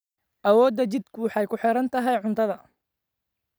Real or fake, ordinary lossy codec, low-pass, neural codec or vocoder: real; none; none; none